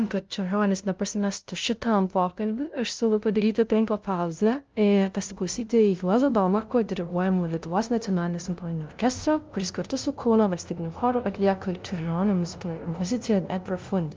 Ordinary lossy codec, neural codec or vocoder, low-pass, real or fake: Opus, 24 kbps; codec, 16 kHz, 0.5 kbps, FunCodec, trained on LibriTTS, 25 frames a second; 7.2 kHz; fake